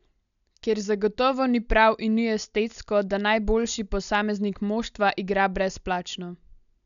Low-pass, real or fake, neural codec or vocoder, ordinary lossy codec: 7.2 kHz; real; none; none